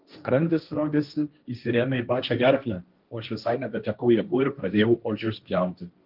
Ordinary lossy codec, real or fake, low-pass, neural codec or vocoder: Opus, 32 kbps; fake; 5.4 kHz; codec, 16 kHz, 1.1 kbps, Voila-Tokenizer